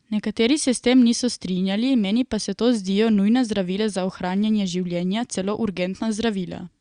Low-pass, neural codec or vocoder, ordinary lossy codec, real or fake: 9.9 kHz; none; Opus, 64 kbps; real